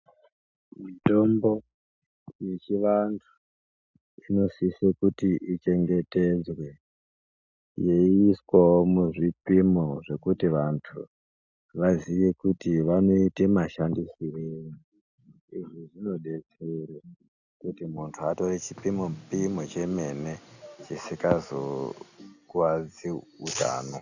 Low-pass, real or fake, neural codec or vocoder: 7.2 kHz; real; none